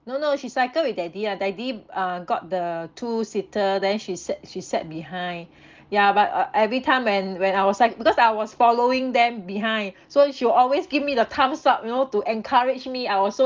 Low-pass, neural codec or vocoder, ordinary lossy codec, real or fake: 7.2 kHz; none; Opus, 24 kbps; real